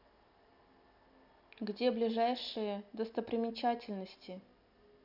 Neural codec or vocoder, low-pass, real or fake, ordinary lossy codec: none; 5.4 kHz; real; none